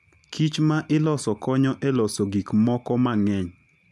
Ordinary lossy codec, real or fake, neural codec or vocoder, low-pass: none; real; none; none